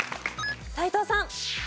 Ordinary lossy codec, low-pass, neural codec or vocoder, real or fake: none; none; none; real